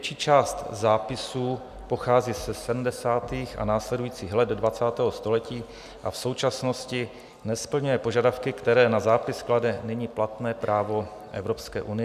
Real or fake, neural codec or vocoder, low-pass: real; none; 14.4 kHz